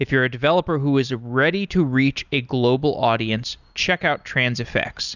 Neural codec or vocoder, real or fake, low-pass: none; real; 7.2 kHz